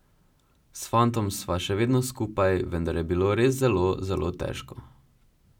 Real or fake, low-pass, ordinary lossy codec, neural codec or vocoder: real; 19.8 kHz; none; none